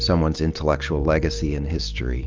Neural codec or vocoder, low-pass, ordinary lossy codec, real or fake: none; 7.2 kHz; Opus, 32 kbps; real